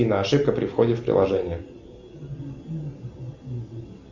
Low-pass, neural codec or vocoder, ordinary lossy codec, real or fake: 7.2 kHz; none; Opus, 64 kbps; real